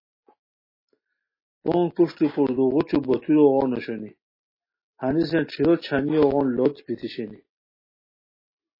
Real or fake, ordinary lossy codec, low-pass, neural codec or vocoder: real; MP3, 24 kbps; 5.4 kHz; none